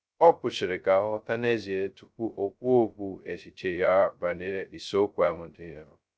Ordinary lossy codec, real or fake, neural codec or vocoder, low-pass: none; fake; codec, 16 kHz, 0.2 kbps, FocalCodec; none